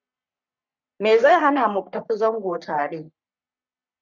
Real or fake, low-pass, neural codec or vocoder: fake; 7.2 kHz; codec, 44.1 kHz, 3.4 kbps, Pupu-Codec